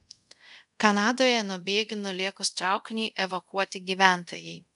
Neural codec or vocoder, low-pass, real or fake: codec, 24 kHz, 0.5 kbps, DualCodec; 10.8 kHz; fake